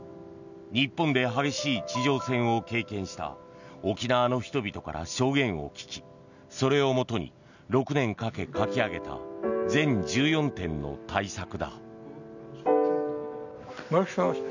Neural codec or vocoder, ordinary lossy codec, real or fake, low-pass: none; none; real; 7.2 kHz